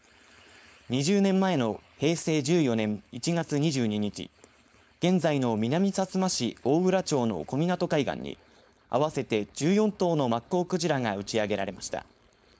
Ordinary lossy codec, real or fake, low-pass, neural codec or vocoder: none; fake; none; codec, 16 kHz, 4.8 kbps, FACodec